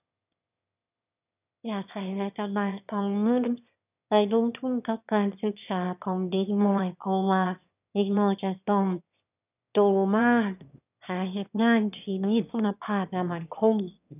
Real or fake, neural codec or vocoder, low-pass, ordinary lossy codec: fake; autoencoder, 22.05 kHz, a latent of 192 numbers a frame, VITS, trained on one speaker; 3.6 kHz; none